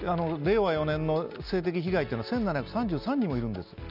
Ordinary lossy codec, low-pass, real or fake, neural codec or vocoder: none; 5.4 kHz; real; none